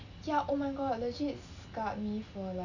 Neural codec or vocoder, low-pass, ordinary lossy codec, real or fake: none; 7.2 kHz; none; real